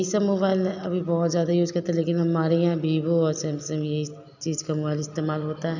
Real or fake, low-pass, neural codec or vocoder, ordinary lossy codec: real; 7.2 kHz; none; none